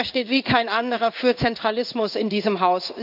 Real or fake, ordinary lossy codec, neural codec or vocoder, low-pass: fake; none; codec, 16 kHz in and 24 kHz out, 1 kbps, XY-Tokenizer; 5.4 kHz